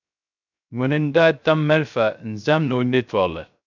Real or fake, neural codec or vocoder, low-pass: fake; codec, 16 kHz, 0.3 kbps, FocalCodec; 7.2 kHz